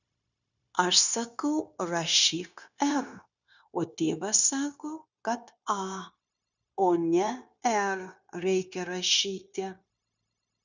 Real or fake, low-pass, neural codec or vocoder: fake; 7.2 kHz; codec, 16 kHz, 0.9 kbps, LongCat-Audio-Codec